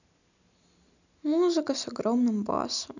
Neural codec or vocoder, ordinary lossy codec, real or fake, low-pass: none; none; real; 7.2 kHz